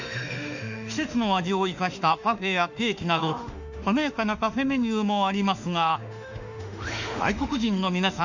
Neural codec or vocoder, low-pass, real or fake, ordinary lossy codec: autoencoder, 48 kHz, 32 numbers a frame, DAC-VAE, trained on Japanese speech; 7.2 kHz; fake; none